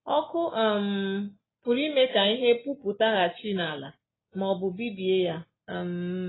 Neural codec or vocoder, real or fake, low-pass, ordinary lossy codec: none; real; 7.2 kHz; AAC, 16 kbps